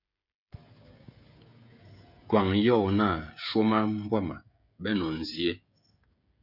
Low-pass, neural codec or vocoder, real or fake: 5.4 kHz; codec, 16 kHz, 16 kbps, FreqCodec, smaller model; fake